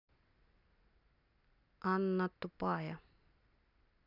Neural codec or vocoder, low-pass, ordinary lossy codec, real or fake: none; 5.4 kHz; none; real